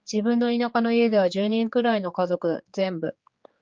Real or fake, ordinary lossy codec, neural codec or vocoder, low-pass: fake; Opus, 24 kbps; codec, 16 kHz, 4 kbps, X-Codec, HuBERT features, trained on general audio; 7.2 kHz